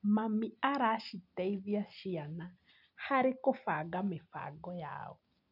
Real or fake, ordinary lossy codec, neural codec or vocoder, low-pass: real; none; none; 5.4 kHz